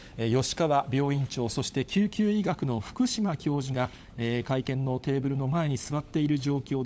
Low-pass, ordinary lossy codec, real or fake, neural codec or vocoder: none; none; fake; codec, 16 kHz, 16 kbps, FunCodec, trained on LibriTTS, 50 frames a second